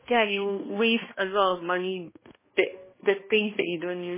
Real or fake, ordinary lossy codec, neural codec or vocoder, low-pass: fake; MP3, 16 kbps; codec, 16 kHz, 1 kbps, X-Codec, HuBERT features, trained on balanced general audio; 3.6 kHz